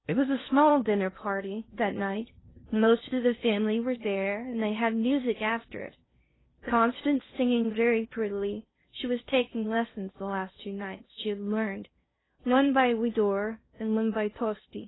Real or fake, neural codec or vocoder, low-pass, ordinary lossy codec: fake; codec, 16 kHz in and 24 kHz out, 0.8 kbps, FocalCodec, streaming, 65536 codes; 7.2 kHz; AAC, 16 kbps